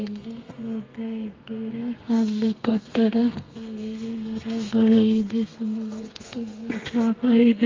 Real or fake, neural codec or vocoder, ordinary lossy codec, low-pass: fake; codec, 32 kHz, 1.9 kbps, SNAC; Opus, 32 kbps; 7.2 kHz